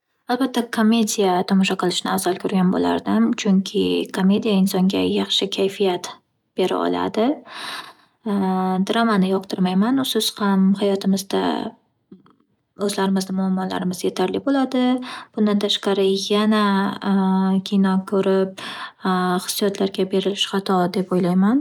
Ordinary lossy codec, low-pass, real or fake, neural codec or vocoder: none; 19.8 kHz; real; none